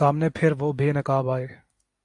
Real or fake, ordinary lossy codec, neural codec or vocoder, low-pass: real; AAC, 64 kbps; none; 10.8 kHz